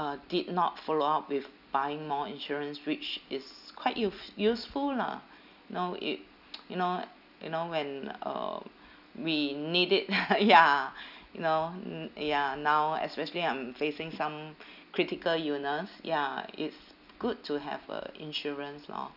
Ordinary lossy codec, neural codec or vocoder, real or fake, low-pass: none; none; real; 5.4 kHz